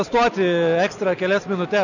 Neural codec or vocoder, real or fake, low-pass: none; real; 7.2 kHz